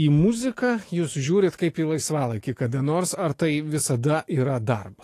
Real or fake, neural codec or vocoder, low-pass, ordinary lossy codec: fake; autoencoder, 48 kHz, 128 numbers a frame, DAC-VAE, trained on Japanese speech; 14.4 kHz; AAC, 48 kbps